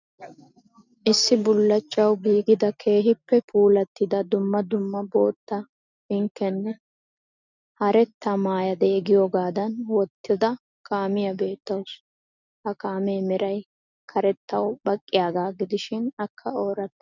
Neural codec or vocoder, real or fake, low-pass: none; real; 7.2 kHz